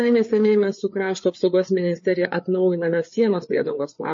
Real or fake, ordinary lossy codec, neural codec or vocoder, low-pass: fake; MP3, 32 kbps; codec, 16 kHz, 4 kbps, FreqCodec, larger model; 7.2 kHz